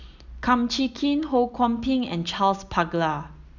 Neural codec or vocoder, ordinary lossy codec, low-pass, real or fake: none; none; 7.2 kHz; real